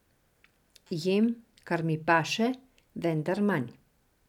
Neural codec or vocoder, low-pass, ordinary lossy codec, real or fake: none; 19.8 kHz; none; real